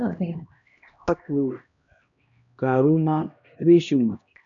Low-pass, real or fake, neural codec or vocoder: 7.2 kHz; fake; codec, 16 kHz, 1 kbps, X-Codec, HuBERT features, trained on LibriSpeech